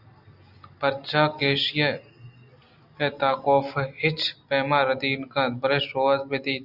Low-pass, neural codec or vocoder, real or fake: 5.4 kHz; none; real